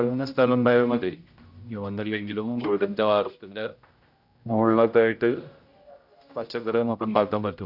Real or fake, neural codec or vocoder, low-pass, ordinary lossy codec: fake; codec, 16 kHz, 0.5 kbps, X-Codec, HuBERT features, trained on general audio; 5.4 kHz; none